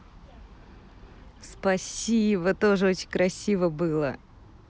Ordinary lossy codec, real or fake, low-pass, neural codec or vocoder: none; real; none; none